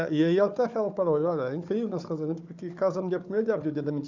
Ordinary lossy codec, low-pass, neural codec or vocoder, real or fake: none; 7.2 kHz; codec, 16 kHz, 4 kbps, FunCodec, trained on Chinese and English, 50 frames a second; fake